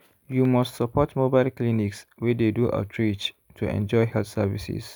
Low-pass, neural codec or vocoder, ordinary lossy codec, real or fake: none; none; none; real